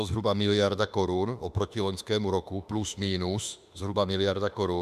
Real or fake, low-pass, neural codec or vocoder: fake; 14.4 kHz; autoencoder, 48 kHz, 32 numbers a frame, DAC-VAE, trained on Japanese speech